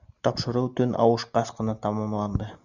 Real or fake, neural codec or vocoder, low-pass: real; none; 7.2 kHz